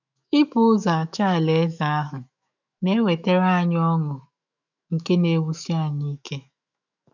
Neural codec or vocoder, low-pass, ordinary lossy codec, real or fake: autoencoder, 48 kHz, 128 numbers a frame, DAC-VAE, trained on Japanese speech; 7.2 kHz; none; fake